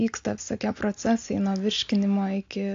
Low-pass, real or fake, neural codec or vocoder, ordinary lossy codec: 7.2 kHz; real; none; AAC, 48 kbps